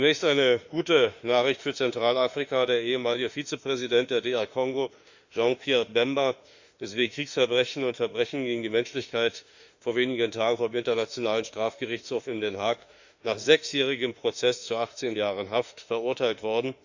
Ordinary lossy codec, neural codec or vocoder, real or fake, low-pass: Opus, 64 kbps; autoencoder, 48 kHz, 32 numbers a frame, DAC-VAE, trained on Japanese speech; fake; 7.2 kHz